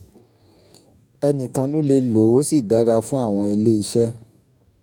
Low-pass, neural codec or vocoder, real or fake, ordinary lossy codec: 19.8 kHz; codec, 44.1 kHz, 2.6 kbps, DAC; fake; none